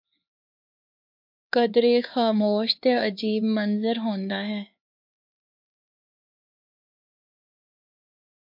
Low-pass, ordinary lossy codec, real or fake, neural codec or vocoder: 5.4 kHz; MP3, 48 kbps; fake; codec, 16 kHz, 4 kbps, X-Codec, HuBERT features, trained on LibriSpeech